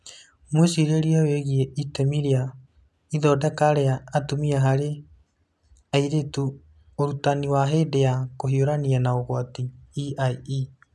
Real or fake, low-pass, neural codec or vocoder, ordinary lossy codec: real; none; none; none